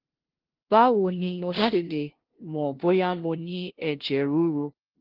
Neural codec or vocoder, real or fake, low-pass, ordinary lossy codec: codec, 16 kHz, 0.5 kbps, FunCodec, trained on LibriTTS, 25 frames a second; fake; 5.4 kHz; Opus, 16 kbps